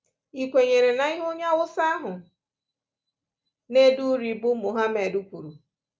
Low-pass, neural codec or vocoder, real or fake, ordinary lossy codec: none; none; real; none